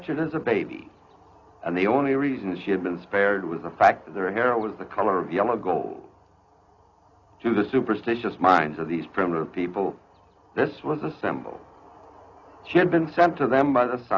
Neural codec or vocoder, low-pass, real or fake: none; 7.2 kHz; real